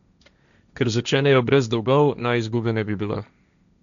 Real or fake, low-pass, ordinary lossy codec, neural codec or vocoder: fake; 7.2 kHz; none; codec, 16 kHz, 1.1 kbps, Voila-Tokenizer